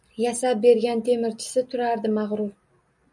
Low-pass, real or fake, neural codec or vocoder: 10.8 kHz; real; none